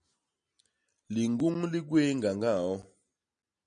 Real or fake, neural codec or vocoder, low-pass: real; none; 9.9 kHz